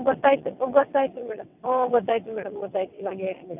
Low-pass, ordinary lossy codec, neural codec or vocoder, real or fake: 3.6 kHz; none; vocoder, 22.05 kHz, 80 mel bands, WaveNeXt; fake